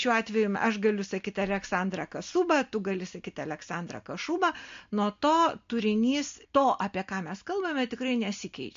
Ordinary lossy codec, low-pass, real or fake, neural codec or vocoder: MP3, 48 kbps; 7.2 kHz; real; none